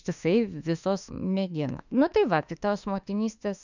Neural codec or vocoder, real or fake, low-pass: autoencoder, 48 kHz, 32 numbers a frame, DAC-VAE, trained on Japanese speech; fake; 7.2 kHz